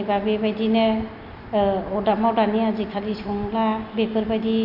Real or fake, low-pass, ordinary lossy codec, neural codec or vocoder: real; 5.4 kHz; AAC, 48 kbps; none